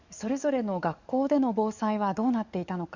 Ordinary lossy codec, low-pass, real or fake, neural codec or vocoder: Opus, 64 kbps; 7.2 kHz; fake; codec, 44.1 kHz, 7.8 kbps, DAC